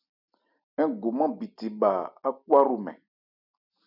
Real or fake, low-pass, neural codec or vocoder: real; 5.4 kHz; none